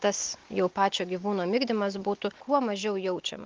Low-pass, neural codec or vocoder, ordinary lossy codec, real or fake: 7.2 kHz; none; Opus, 24 kbps; real